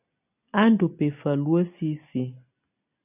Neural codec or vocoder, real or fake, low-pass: none; real; 3.6 kHz